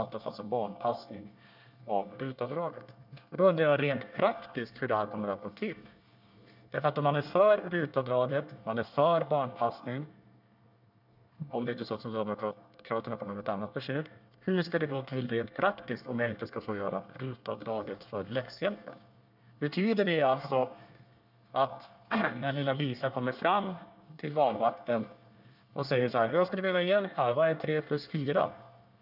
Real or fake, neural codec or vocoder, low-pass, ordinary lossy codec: fake; codec, 24 kHz, 1 kbps, SNAC; 5.4 kHz; none